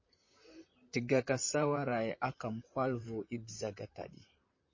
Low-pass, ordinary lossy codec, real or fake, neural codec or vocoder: 7.2 kHz; MP3, 32 kbps; fake; vocoder, 44.1 kHz, 128 mel bands, Pupu-Vocoder